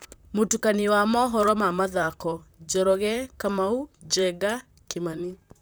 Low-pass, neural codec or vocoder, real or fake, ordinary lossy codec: none; vocoder, 44.1 kHz, 128 mel bands, Pupu-Vocoder; fake; none